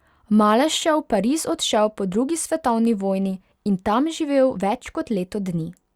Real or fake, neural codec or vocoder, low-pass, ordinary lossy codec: real; none; 19.8 kHz; Opus, 64 kbps